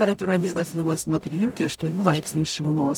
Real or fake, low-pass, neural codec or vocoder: fake; 19.8 kHz; codec, 44.1 kHz, 0.9 kbps, DAC